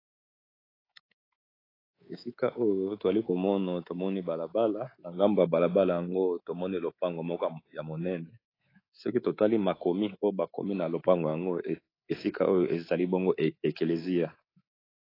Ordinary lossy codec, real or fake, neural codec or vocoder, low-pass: AAC, 24 kbps; fake; codec, 24 kHz, 3.1 kbps, DualCodec; 5.4 kHz